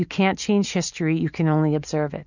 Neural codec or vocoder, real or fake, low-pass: none; real; 7.2 kHz